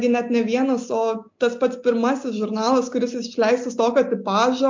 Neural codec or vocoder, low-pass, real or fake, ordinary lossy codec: none; 7.2 kHz; real; MP3, 48 kbps